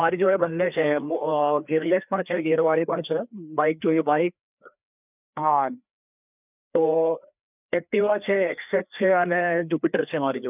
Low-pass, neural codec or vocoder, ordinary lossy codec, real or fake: 3.6 kHz; codec, 16 kHz, 2 kbps, FreqCodec, larger model; none; fake